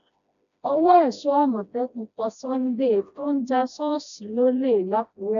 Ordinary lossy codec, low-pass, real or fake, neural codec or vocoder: AAC, 96 kbps; 7.2 kHz; fake; codec, 16 kHz, 1 kbps, FreqCodec, smaller model